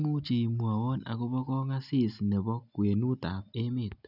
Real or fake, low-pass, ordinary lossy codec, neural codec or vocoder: real; 5.4 kHz; none; none